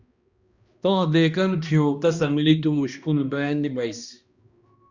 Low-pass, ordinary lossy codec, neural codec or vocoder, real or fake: 7.2 kHz; Opus, 64 kbps; codec, 16 kHz, 1 kbps, X-Codec, HuBERT features, trained on balanced general audio; fake